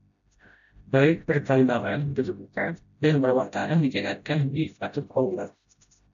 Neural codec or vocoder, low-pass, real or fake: codec, 16 kHz, 0.5 kbps, FreqCodec, smaller model; 7.2 kHz; fake